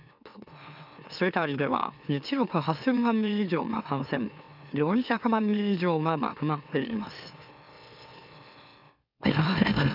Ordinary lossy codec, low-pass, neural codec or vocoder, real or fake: none; 5.4 kHz; autoencoder, 44.1 kHz, a latent of 192 numbers a frame, MeloTTS; fake